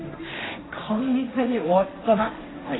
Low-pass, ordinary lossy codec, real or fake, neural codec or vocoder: 7.2 kHz; AAC, 16 kbps; fake; codec, 16 kHz, 1.1 kbps, Voila-Tokenizer